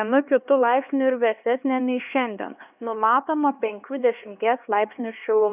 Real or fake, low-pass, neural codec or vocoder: fake; 3.6 kHz; codec, 16 kHz, 2 kbps, X-Codec, HuBERT features, trained on LibriSpeech